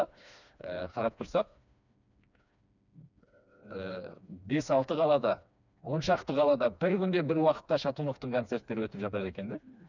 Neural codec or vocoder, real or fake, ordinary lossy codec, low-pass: codec, 16 kHz, 2 kbps, FreqCodec, smaller model; fake; none; 7.2 kHz